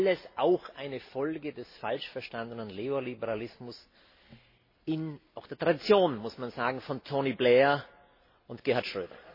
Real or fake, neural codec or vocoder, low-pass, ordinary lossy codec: real; none; 5.4 kHz; MP3, 24 kbps